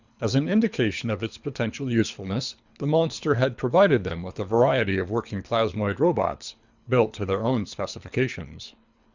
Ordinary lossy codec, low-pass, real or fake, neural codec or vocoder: Opus, 64 kbps; 7.2 kHz; fake; codec, 24 kHz, 3 kbps, HILCodec